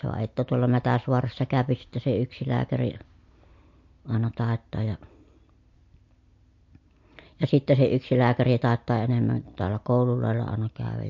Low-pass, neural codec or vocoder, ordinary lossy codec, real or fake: 7.2 kHz; none; MP3, 48 kbps; real